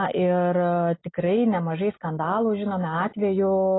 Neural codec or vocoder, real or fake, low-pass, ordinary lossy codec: none; real; 7.2 kHz; AAC, 16 kbps